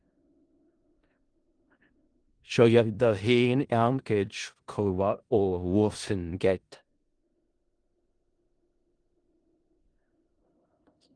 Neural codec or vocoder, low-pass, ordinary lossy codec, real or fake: codec, 16 kHz in and 24 kHz out, 0.4 kbps, LongCat-Audio-Codec, four codebook decoder; 9.9 kHz; Opus, 24 kbps; fake